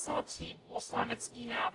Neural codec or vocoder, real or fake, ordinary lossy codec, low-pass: codec, 44.1 kHz, 0.9 kbps, DAC; fake; AAC, 48 kbps; 10.8 kHz